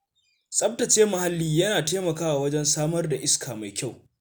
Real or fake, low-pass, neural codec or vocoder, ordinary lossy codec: real; none; none; none